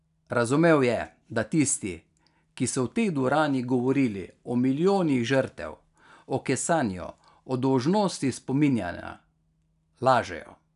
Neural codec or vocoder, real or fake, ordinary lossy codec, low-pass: none; real; none; 10.8 kHz